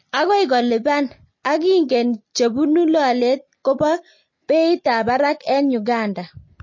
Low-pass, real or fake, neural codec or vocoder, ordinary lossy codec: 7.2 kHz; real; none; MP3, 32 kbps